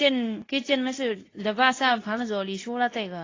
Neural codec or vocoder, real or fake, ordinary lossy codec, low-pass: codec, 24 kHz, 0.9 kbps, WavTokenizer, medium speech release version 2; fake; AAC, 32 kbps; 7.2 kHz